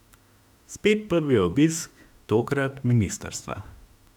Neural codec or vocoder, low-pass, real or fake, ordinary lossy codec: autoencoder, 48 kHz, 32 numbers a frame, DAC-VAE, trained on Japanese speech; 19.8 kHz; fake; none